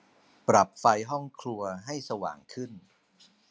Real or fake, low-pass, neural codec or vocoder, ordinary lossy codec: real; none; none; none